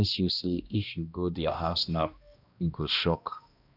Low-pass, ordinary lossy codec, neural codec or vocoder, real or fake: 5.4 kHz; none; codec, 16 kHz, 1 kbps, X-Codec, HuBERT features, trained on balanced general audio; fake